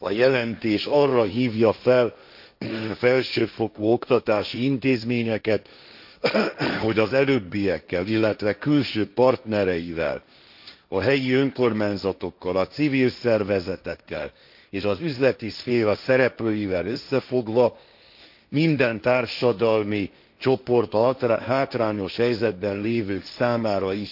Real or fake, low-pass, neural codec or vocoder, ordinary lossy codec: fake; 5.4 kHz; codec, 16 kHz, 1.1 kbps, Voila-Tokenizer; AAC, 48 kbps